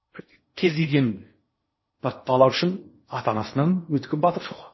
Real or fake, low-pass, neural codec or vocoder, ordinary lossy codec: fake; 7.2 kHz; codec, 16 kHz in and 24 kHz out, 0.6 kbps, FocalCodec, streaming, 4096 codes; MP3, 24 kbps